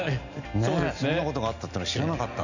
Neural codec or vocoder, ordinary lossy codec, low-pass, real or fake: none; none; 7.2 kHz; real